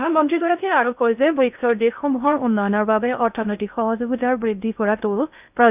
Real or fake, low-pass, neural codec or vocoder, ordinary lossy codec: fake; 3.6 kHz; codec, 16 kHz in and 24 kHz out, 0.6 kbps, FocalCodec, streaming, 2048 codes; none